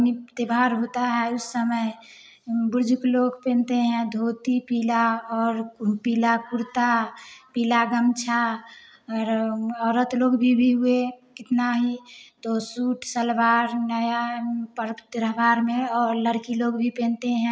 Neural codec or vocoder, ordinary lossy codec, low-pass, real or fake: none; none; none; real